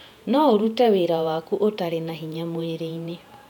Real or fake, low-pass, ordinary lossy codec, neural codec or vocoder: fake; 19.8 kHz; none; vocoder, 48 kHz, 128 mel bands, Vocos